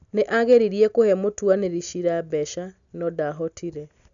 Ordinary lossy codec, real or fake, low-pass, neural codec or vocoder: none; real; 7.2 kHz; none